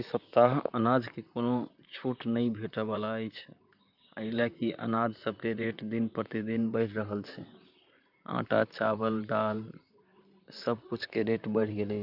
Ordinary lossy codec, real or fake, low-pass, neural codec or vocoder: none; fake; 5.4 kHz; vocoder, 44.1 kHz, 128 mel bands, Pupu-Vocoder